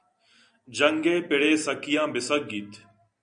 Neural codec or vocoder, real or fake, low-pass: none; real; 9.9 kHz